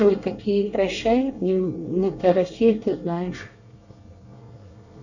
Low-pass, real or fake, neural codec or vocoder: 7.2 kHz; fake; codec, 24 kHz, 1 kbps, SNAC